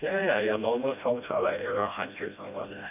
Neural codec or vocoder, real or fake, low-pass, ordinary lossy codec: codec, 16 kHz, 1 kbps, FreqCodec, smaller model; fake; 3.6 kHz; none